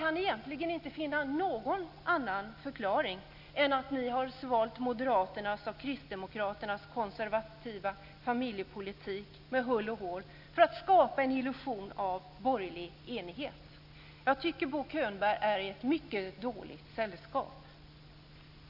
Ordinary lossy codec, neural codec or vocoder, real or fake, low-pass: none; none; real; 5.4 kHz